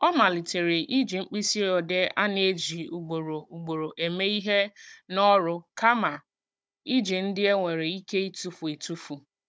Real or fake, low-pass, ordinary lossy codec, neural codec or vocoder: fake; none; none; codec, 16 kHz, 16 kbps, FunCodec, trained on Chinese and English, 50 frames a second